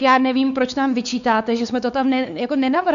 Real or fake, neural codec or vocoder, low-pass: fake; codec, 16 kHz, 4 kbps, X-Codec, WavLM features, trained on Multilingual LibriSpeech; 7.2 kHz